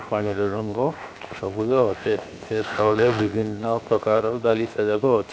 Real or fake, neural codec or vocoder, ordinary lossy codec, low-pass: fake; codec, 16 kHz, 0.7 kbps, FocalCodec; none; none